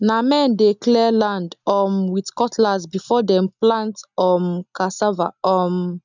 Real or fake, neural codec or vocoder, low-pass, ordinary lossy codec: real; none; 7.2 kHz; none